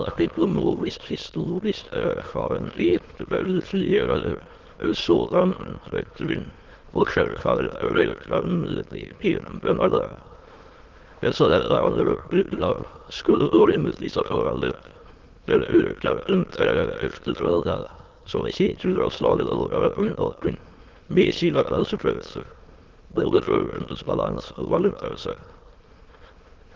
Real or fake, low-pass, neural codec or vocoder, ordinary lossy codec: fake; 7.2 kHz; autoencoder, 22.05 kHz, a latent of 192 numbers a frame, VITS, trained on many speakers; Opus, 16 kbps